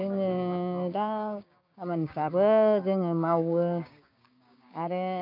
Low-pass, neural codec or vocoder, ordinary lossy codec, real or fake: 5.4 kHz; codec, 16 kHz, 6 kbps, DAC; none; fake